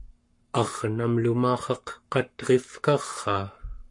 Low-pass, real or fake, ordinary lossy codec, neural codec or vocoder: 10.8 kHz; fake; MP3, 48 kbps; codec, 44.1 kHz, 7.8 kbps, Pupu-Codec